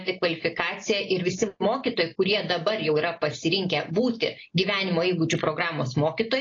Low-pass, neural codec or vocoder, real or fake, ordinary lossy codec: 7.2 kHz; none; real; AAC, 32 kbps